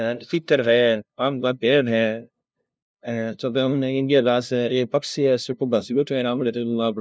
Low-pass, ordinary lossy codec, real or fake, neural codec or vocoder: none; none; fake; codec, 16 kHz, 0.5 kbps, FunCodec, trained on LibriTTS, 25 frames a second